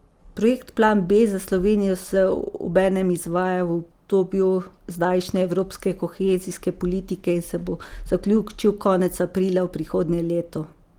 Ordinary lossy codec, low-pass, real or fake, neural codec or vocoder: Opus, 24 kbps; 19.8 kHz; real; none